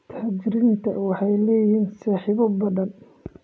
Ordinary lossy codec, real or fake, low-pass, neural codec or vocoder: none; real; none; none